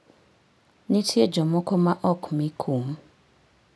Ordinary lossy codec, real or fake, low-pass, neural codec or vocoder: none; real; none; none